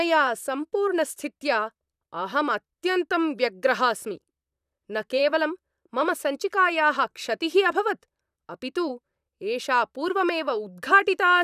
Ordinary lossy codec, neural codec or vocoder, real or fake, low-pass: none; vocoder, 44.1 kHz, 128 mel bands, Pupu-Vocoder; fake; 14.4 kHz